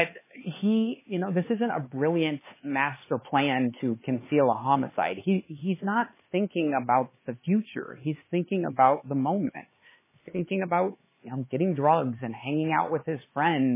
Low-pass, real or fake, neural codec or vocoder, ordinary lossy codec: 3.6 kHz; fake; codec, 16 kHz, 4 kbps, X-Codec, HuBERT features, trained on LibriSpeech; MP3, 16 kbps